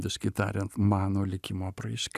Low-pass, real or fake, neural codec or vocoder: 14.4 kHz; real; none